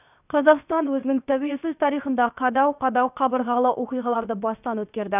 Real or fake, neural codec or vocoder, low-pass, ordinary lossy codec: fake; codec, 16 kHz, 0.7 kbps, FocalCodec; 3.6 kHz; none